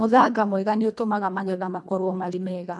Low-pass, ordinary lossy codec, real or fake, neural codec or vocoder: none; none; fake; codec, 24 kHz, 1.5 kbps, HILCodec